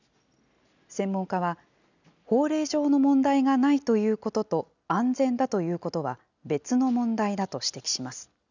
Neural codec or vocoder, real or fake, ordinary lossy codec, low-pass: none; real; none; 7.2 kHz